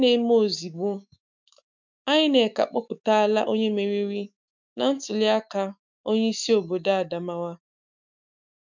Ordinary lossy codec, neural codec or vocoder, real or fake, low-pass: MP3, 64 kbps; autoencoder, 48 kHz, 128 numbers a frame, DAC-VAE, trained on Japanese speech; fake; 7.2 kHz